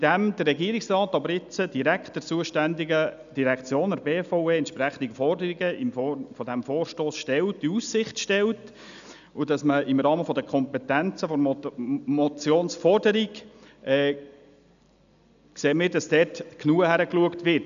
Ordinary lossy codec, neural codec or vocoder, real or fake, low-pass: none; none; real; 7.2 kHz